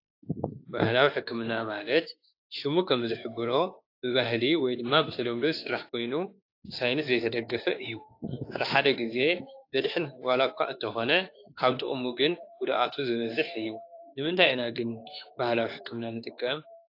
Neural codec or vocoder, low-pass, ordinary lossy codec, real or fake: autoencoder, 48 kHz, 32 numbers a frame, DAC-VAE, trained on Japanese speech; 5.4 kHz; AAC, 32 kbps; fake